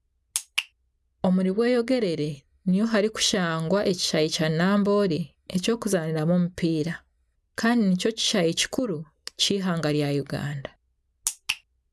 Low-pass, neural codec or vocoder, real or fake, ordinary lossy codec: none; none; real; none